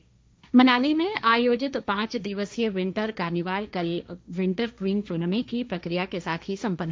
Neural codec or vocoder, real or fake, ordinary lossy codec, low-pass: codec, 16 kHz, 1.1 kbps, Voila-Tokenizer; fake; none; none